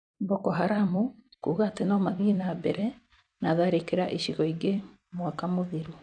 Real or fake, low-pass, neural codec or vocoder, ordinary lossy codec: fake; 9.9 kHz; vocoder, 44.1 kHz, 128 mel bands every 256 samples, BigVGAN v2; MP3, 96 kbps